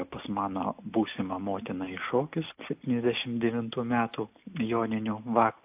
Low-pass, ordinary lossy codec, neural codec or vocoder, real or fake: 3.6 kHz; AAC, 32 kbps; none; real